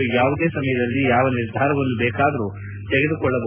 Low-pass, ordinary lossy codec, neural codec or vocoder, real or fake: 3.6 kHz; none; none; real